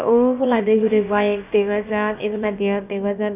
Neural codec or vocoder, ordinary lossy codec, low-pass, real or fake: codec, 16 kHz, about 1 kbps, DyCAST, with the encoder's durations; none; 3.6 kHz; fake